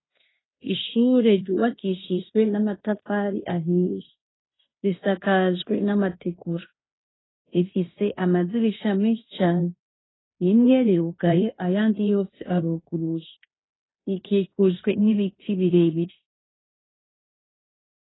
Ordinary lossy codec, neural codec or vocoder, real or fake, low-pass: AAC, 16 kbps; codec, 16 kHz in and 24 kHz out, 0.9 kbps, LongCat-Audio-Codec, fine tuned four codebook decoder; fake; 7.2 kHz